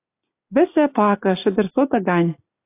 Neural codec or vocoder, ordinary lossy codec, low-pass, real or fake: none; AAC, 24 kbps; 3.6 kHz; real